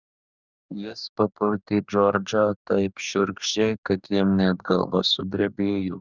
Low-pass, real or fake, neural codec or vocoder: 7.2 kHz; fake; codec, 44.1 kHz, 2.6 kbps, DAC